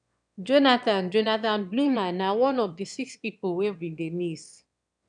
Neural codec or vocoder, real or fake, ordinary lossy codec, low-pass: autoencoder, 22.05 kHz, a latent of 192 numbers a frame, VITS, trained on one speaker; fake; none; 9.9 kHz